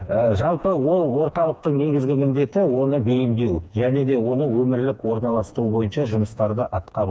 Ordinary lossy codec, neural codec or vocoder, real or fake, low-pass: none; codec, 16 kHz, 2 kbps, FreqCodec, smaller model; fake; none